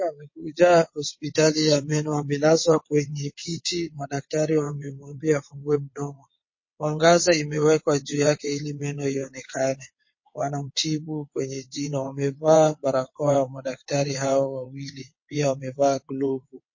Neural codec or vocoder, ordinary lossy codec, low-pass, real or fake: vocoder, 22.05 kHz, 80 mel bands, WaveNeXt; MP3, 32 kbps; 7.2 kHz; fake